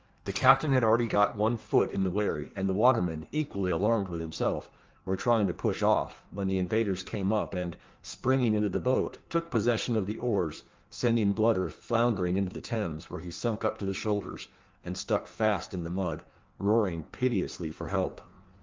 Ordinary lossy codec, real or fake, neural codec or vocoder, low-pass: Opus, 24 kbps; fake; codec, 16 kHz in and 24 kHz out, 1.1 kbps, FireRedTTS-2 codec; 7.2 kHz